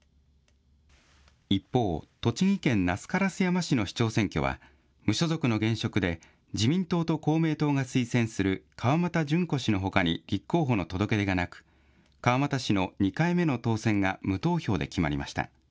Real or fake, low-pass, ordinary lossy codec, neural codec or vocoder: real; none; none; none